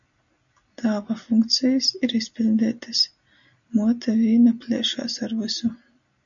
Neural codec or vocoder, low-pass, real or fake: none; 7.2 kHz; real